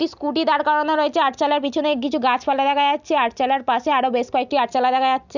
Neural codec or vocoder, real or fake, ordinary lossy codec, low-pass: none; real; none; 7.2 kHz